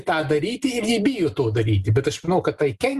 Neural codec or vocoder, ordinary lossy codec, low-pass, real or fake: none; Opus, 32 kbps; 14.4 kHz; real